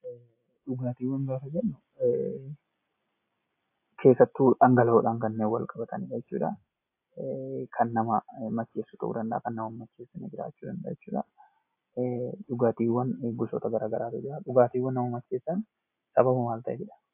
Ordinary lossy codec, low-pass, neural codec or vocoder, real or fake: AAC, 32 kbps; 3.6 kHz; none; real